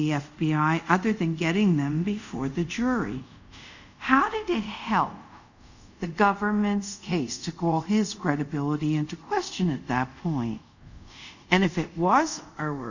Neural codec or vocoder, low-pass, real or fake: codec, 24 kHz, 0.5 kbps, DualCodec; 7.2 kHz; fake